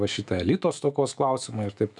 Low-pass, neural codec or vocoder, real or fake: 10.8 kHz; autoencoder, 48 kHz, 128 numbers a frame, DAC-VAE, trained on Japanese speech; fake